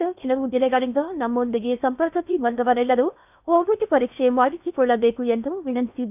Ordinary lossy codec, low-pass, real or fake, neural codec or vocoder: none; 3.6 kHz; fake; codec, 16 kHz in and 24 kHz out, 0.8 kbps, FocalCodec, streaming, 65536 codes